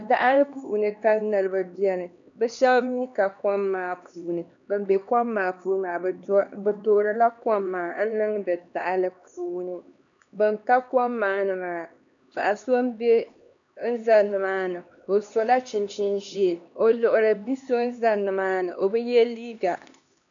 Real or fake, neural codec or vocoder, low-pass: fake; codec, 16 kHz, 2 kbps, X-Codec, HuBERT features, trained on LibriSpeech; 7.2 kHz